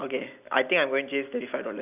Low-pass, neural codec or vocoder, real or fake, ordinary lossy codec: 3.6 kHz; none; real; none